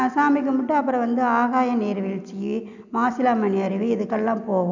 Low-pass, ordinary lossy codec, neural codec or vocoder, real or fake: 7.2 kHz; none; none; real